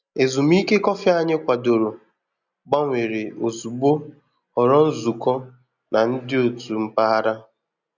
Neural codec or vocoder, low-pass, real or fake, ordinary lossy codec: none; 7.2 kHz; real; none